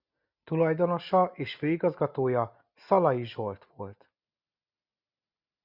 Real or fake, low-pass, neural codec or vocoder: real; 5.4 kHz; none